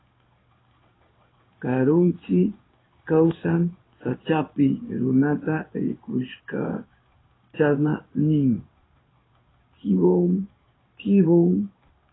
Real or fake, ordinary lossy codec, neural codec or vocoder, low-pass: fake; AAC, 16 kbps; codec, 16 kHz in and 24 kHz out, 1 kbps, XY-Tokenizer; 7.2 kHz